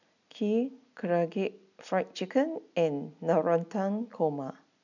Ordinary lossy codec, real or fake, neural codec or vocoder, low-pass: none; real; none; 7.2 kHz